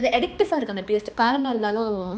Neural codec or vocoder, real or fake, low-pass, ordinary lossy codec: codec, 16 kHz, 2 kbps, X-Codec, HuBERT features, trained on balanced general audio; fake; none; none